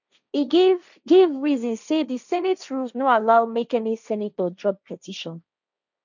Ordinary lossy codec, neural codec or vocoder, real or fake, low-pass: none; codec, 16 kHz, 1.1 kbps, Voila-Tokenizer; fake; 7.2 kHz